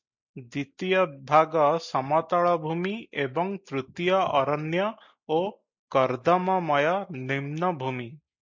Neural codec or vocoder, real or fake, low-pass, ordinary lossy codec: none; real; 7.2 kHz; MP3, 64 kbps